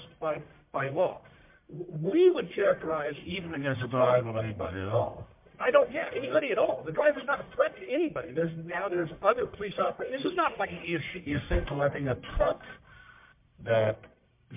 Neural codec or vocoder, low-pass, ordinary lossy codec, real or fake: codec, 44.1 kHz, 1.7 kbps, Pupu-Codec; 3.6 kHz; MP3, 32 kbps; fake